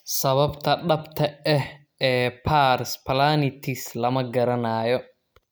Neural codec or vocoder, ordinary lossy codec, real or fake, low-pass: none; none; real; none